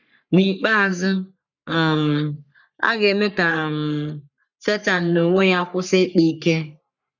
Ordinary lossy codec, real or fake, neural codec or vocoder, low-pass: none; fake; codec, 44.1 kHz, 3.4 kbps, Pupu-Codec; 7.2 kHz